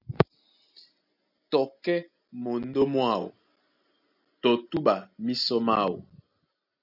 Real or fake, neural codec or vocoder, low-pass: real; none; 5.4 kHz